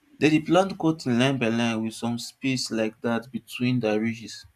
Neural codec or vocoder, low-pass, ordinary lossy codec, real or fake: vocoder, 44.1 kHz, 128 mel bands every 512 samples, BigVGAN v2; 14.4 kHz; none; fake